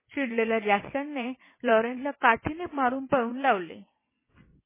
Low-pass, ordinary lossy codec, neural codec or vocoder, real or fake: 3.6 kHz; MP3, 16 kbps; vocoder, 22.05 kHz, 80 mel bands, WaveNeXt; fake